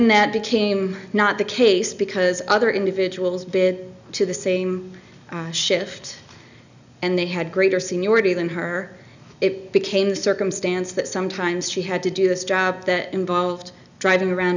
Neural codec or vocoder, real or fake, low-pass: none; real; 7.2 kHz